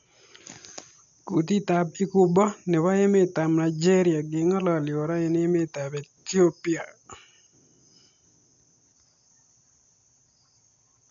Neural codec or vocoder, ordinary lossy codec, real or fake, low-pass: none; none; real; 7.2 kHz